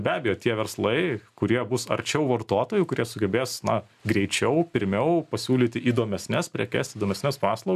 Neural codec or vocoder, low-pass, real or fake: none; 14.4 kHz; real